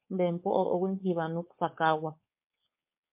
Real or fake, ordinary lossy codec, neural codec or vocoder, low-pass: fake; MP3, 32 kbps; codec, 16 kHz, 4.8 kbps, FACodec; 3.6 kHz